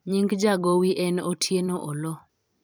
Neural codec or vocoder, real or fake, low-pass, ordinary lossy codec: vocoder, 44.1 kHz, 128 mel bands every 256 samples, BigVGAN v2; fake; none; none